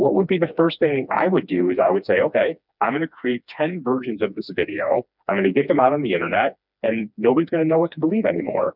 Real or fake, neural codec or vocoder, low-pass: fake; codec, 16 kHz, 2 kbps, FreqCodec, smaller model; 5.4 kHz